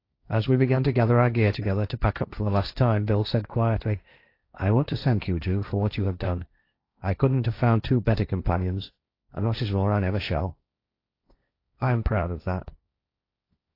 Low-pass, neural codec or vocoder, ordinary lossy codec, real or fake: 5.4 kHz; codec, 16 kHz, 1.1 kbps, Voila-Tokenizer; AAC, 32 kbps; fake